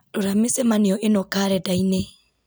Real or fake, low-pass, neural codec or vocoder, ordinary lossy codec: real; none; none; none